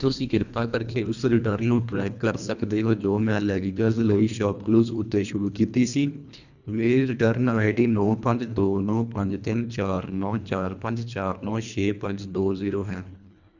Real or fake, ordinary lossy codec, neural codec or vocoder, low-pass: fake; none; codec, 24 kHz, 1.5 kbps, HILCodec; 7.2 kHz